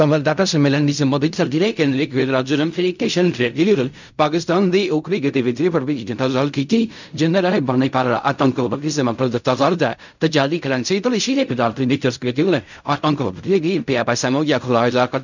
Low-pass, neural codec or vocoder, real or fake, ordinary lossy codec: 7.2 kHz; codec, 16 kHz in and 24 kHz out, 0.4 kbps, LongCat-Audio-Codec, fine tuned four codebook decoder; fake; none